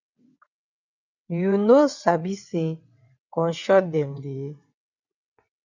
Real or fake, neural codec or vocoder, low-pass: fake; vocoder, 22.05 kHz, 80 mel bands, WaveNeXt; 7.2 kHz